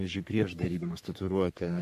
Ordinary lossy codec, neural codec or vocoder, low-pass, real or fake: AAC, 96 kbps; codec, 44.1 kHz, 3.4 kbps, Pupu-Codec; 14.4 kHz; fake